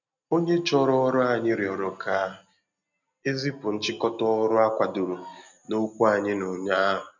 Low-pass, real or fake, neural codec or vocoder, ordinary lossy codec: 7.2 kHz; real; none; none